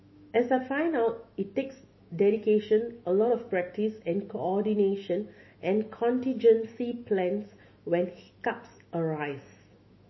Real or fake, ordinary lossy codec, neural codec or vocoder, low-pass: fake; MP3, 24 kbps; autoencoder, 48 kHz, 128 numbers a frame, DAC-VAE, trained on Japanese speech; 7.2 kHz